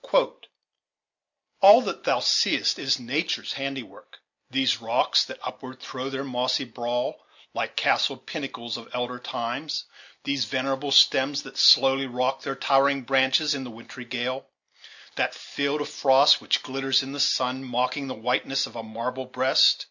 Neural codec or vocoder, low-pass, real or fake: none; 7.2 kHz; real